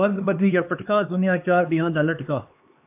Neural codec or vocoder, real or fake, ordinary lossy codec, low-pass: codec, 16 kHz, 2 kbps, X-Codec, HuBERT features, trained on LibriSpeech; fake; AAC, 32 kbps; 3.6 kHz